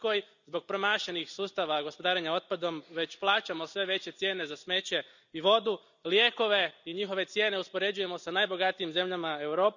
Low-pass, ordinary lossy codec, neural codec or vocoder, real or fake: 7.2 kHz; none; none; real